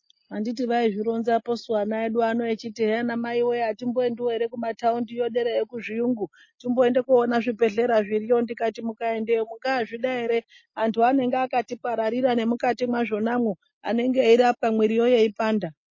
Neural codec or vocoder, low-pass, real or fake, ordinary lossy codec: none; 7.2 kHz; real; MP3, 32 kbps